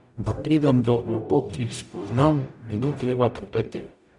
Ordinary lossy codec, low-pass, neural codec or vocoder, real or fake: none; 10.8 kHz; codec, 44.1 kHz, 0.9 kbps, DAC; fake